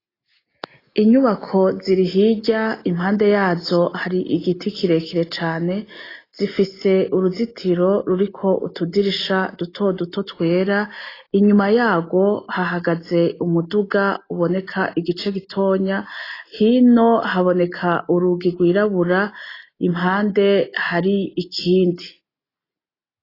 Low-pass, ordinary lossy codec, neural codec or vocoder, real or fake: 5.4 kHz; AAC, 24 kbps; none; real